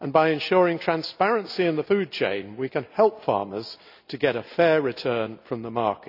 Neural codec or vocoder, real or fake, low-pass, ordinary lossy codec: none; real; 5.4 kHz; none